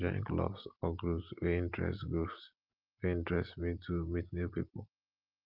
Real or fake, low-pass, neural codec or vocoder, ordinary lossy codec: real; 5.4 kHz; none; Opus, 24 kbps